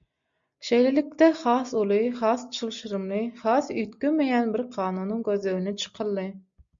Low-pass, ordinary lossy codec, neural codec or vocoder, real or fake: 7.2 kHz; MP3, 64 kbps; none; real